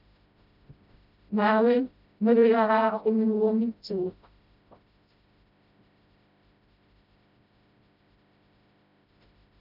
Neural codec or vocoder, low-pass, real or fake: codec, 16 kHz, 0.5 kbps, FreqCodec, smaller model; 5.4 kHz; fake